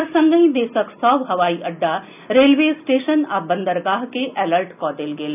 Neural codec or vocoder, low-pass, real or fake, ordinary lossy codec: none; 3.6 kHz; real; none